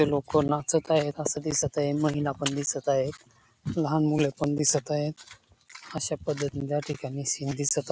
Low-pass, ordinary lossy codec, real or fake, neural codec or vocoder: none; none; real; none